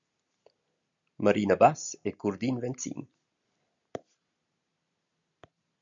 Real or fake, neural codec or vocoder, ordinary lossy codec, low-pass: real; none; MP3, 96 kbps; 7.2 kHz